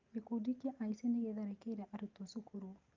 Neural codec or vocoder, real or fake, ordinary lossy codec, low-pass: none; real; Opus, 24 kbps; 7.2 kHz